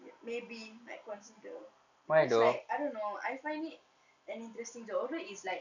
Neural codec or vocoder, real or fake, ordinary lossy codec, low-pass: none; real; none; 7.2 kHz